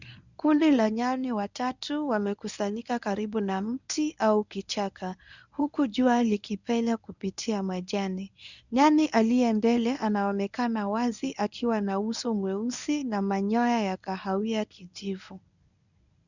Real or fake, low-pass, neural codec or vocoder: fake; 7.2 kHz; codec, 24 kHz, 0.9 kbps, WavTokenizer, medium speech release version 1